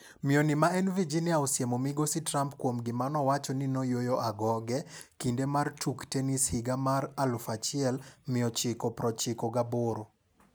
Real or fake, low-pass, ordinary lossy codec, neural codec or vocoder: real; none; none; none